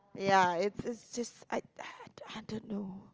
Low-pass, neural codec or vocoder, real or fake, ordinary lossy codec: 7.2 kHz; none; real; Opus, 24 kbps